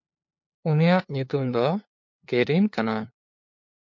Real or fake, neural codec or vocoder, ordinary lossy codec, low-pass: fake; codec, 16 kHz, 2 kbps, FunCodec, trained on LibriTTS, 25 frames a second; MP3, 48 kbps; 7.2 kHz